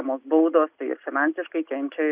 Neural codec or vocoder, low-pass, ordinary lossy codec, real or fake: none; 3.6 kHz; Opus, 64 kbps; real